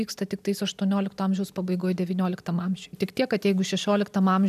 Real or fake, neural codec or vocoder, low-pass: real; none; 14.4 kHz